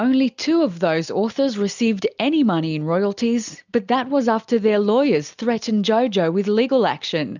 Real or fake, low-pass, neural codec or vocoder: real; 7.2 kHz; none